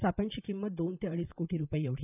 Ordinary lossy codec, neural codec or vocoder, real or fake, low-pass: none; vocoder, 44.1 kHz, 128 mel bands, Pupu-Vocoder; fake; 3.6 kHz